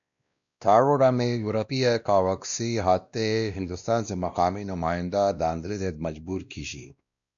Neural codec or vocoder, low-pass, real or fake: codec, 16 kHz, 1 kbps, X-Codec, WavLM features, trained on Multilingual LibriSpeech; 7.2 kHz; fake